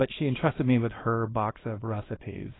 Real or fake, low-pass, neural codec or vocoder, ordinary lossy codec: fake; 7.2 kHz; codec, 24 kHz, 0.9 kbps, WavTokenizer, small release; AAC, 16 kbps